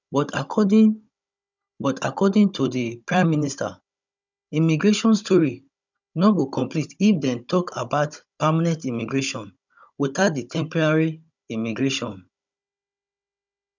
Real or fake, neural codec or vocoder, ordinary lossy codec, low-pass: fake; codec, 16 kHz, 16 kbps, FunCodec, trained on Chinese and English, 50 frames a second; none; 7.2 kHz